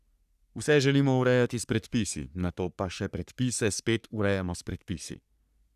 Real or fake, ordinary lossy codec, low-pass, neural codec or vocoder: fake; none; 14.4 kHz; codec, 44.1 kHz, 3.4 kbps, Pupu-Codec